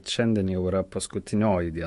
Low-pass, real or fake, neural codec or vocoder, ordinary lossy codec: 14.4 kHz; fake; autoencoder, 48 kHz, 128 numbers a frame, DAC-VAE, trained on Japanese speech; MP3, 48 kbps